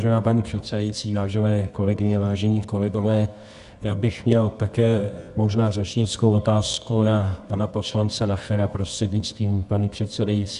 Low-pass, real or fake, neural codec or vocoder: 10.8 kHz; fake; codec, 24 kHz, 0.9 kbps, WavTokenizer, medium music audio release